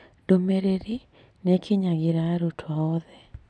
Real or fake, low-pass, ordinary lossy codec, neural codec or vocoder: real; none; none; none